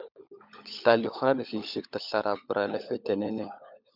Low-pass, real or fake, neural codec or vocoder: 5.4 kHz; fake; codec, 16 kHz, 16 kbps, FunCodec, trained on LibriTTS, 50 frames a second